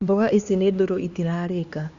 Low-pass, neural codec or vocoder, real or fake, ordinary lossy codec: 7.2 kHz; codec, 16 kHz, 2 kbps, X-Codec, HuBERT features, trained on LibriSpeech; fake; Opus, 64 kbps